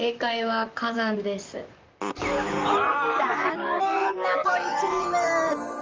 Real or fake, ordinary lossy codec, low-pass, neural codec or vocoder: fake; Opus, 24 kbps; 7.2 kHz; vocoder, 44.1 kHz, 128 mel bands, Pupu-Vocoder